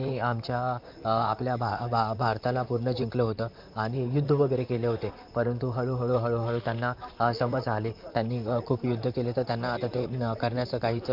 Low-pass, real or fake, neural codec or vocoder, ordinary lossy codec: 5.4 kHz; fake; vocoder, 44.1 kHz, 128 mel bands, Pupu-Vocoder; MP3, 48 kbps